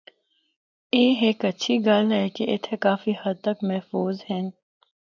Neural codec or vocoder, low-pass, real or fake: none; 7.2 kHz; real